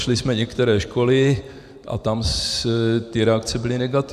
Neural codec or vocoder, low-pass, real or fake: vocoder, 44.1 kHz, 128 mel bands every 512 samples, BigVGAN v2; 14.4 kHz; fake